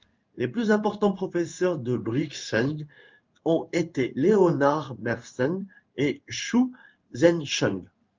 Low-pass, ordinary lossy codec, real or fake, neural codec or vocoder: 7.2 kHz; Opus, 24 kbps; fake; codec, 16 kHz in and 24 kHz out, 1 kbps, XY-Tokenizer